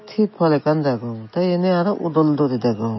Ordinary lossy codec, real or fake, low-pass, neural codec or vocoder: MP3, 24 kbps; real; 7.2 kHz; none